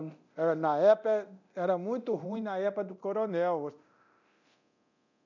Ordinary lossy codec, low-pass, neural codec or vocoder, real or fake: none; 7.2 kHz; codec, 24 kHz, 0.9 kbps, DualCodec; fake